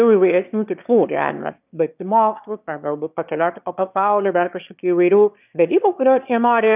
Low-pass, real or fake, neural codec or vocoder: 3.6 kHz; fake; autoencoder, 22.05 kHz, a latent of 192 numbers a frame, VITS, trained on one speaker